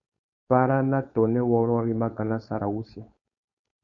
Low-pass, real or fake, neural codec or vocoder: 7.2 kHz; fake; codec, 16 kHz, 4.8 kbps, FACodec